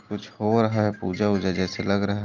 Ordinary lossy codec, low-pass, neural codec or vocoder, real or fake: Opus, 24 kbps; 7.2 kHz; none; real